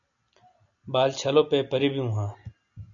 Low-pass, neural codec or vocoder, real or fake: 7.2 kHz; none; real